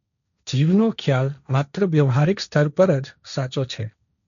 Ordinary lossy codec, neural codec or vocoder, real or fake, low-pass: none; codec, 16 kHz, 1.1 kbps, Voila-Tokenizer; fake; 7.2 kHz